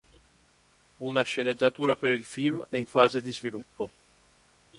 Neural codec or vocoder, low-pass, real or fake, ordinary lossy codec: codec, 24 kHz, 0.9 kbps, WavTokenizer, medium music audio release; 10.8 kHz; fake; MP3, 48 kbps